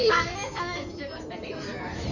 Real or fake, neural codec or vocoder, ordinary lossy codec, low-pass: fake; codec, 16 kHz in and 24 kHz out, 2.2 kbps, FireRedTTS-2 codec; none; 7.2 kHz